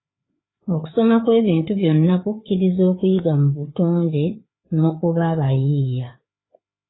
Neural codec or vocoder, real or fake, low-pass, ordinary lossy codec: codec, 16 kHz, 4 kbps, FreqCodec, larger model; fake; 7.2 kHz; AAC, 16 kbps